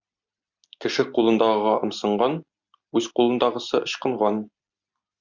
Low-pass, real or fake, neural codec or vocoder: 7.2 kHz; real; none